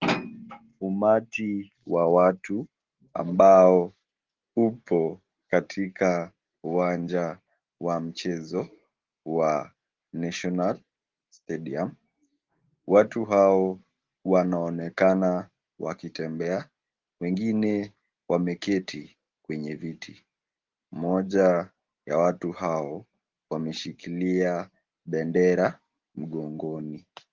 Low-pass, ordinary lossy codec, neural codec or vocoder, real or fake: 7.2 kHz; Opus, 16 kbps; none; real